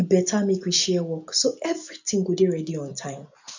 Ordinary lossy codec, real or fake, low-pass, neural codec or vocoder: none; real; 7.2 kHz; none